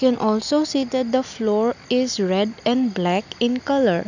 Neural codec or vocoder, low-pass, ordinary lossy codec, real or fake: none; 7.2 kHz; none; real